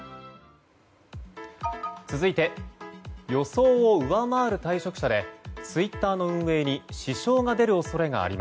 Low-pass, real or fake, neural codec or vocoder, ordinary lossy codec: none; real; none; none